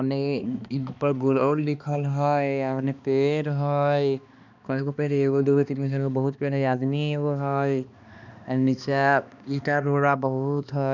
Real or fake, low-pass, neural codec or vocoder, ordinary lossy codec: fake; 7.2 kHz; codec, 16 kHz, 2 kbps, X-Codec, HuBERT features, trained on balanced general audio; none